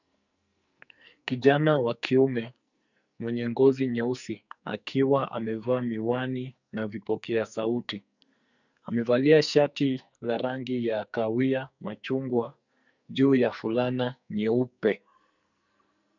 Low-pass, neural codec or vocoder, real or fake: 7.2 kHz; codec, 44.1 kHz, 2.6 kbps, SNAC; fake